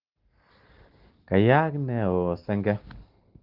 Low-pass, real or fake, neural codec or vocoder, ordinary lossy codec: 5.4 kHz; real; none; Opus, 16 kbps